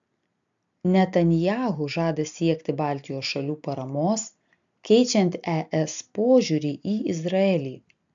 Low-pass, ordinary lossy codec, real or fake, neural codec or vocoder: 7.2 kHz; MP3, 64 kbps; real; none